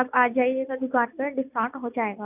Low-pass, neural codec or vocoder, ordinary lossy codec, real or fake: 3.6 kHz; none; none; real